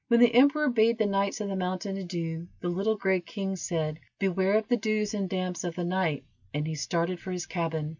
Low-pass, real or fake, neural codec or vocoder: 7.2 kHz; real; none